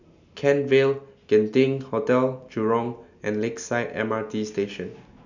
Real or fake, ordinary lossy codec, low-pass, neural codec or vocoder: real; none; 7.2 kHz; none